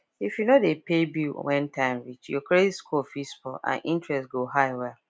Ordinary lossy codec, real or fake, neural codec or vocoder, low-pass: none; real; none; none